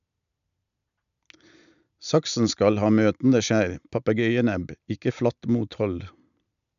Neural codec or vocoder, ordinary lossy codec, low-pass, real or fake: none; none; 7.2 kHz; real